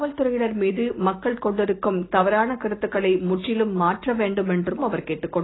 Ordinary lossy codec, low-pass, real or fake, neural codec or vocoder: AAC, 16 kbps; 7.2 kHz; real; none